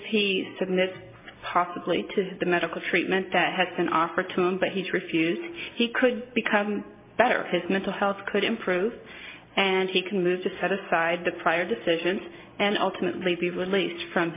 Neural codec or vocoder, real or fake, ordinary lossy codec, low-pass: none; real; MP3, 24 kbps; 3.6 kHz